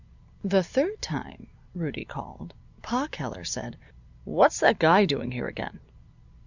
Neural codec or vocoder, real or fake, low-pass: none; real; 7.2 kHz